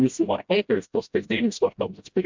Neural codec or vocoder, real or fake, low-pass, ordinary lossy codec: codec, 16 kHz, 0.5 kbps, FreqCodec, smaller model; fake; 7.2 kHz; MP3, 64 kbps